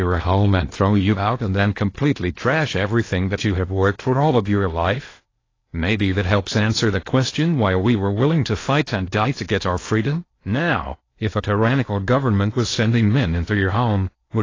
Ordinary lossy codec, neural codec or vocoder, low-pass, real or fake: AAC, 32 kbps; codec, 16 kHz in and 24 kHz out, 0.8 kbps, FocalCodec, streaming, 65536 codes; 7.2 kHz; fake